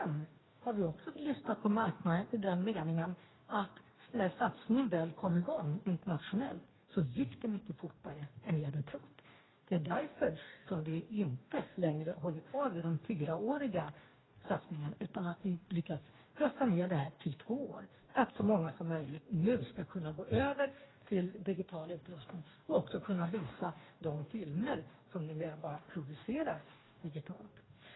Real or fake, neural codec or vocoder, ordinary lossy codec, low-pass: fake; codec, 44.1 kHz, 2.6 kbps, DAC; AAC, 16 kbps; 7.2 kHz